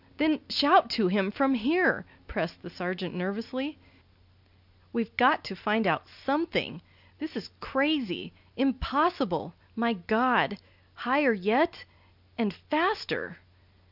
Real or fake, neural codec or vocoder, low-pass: real; none; 5.4 kHz